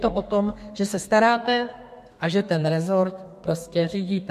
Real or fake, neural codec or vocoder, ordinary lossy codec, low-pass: fake; codec, 32 kHz, 1.9 kbps, SNAC; MP3, 64 kbps; 14.4 kHz